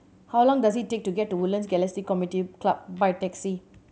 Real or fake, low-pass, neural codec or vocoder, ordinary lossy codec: real; none; none; none